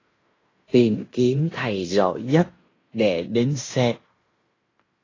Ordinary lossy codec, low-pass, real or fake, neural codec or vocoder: AAC, 32 kbps; 7.2 kHz; fake; codec, 16 kHz in and 24 kHz out, 0.9 kbps, LongCat-Audio-Codec, fine tuned four codebook decoder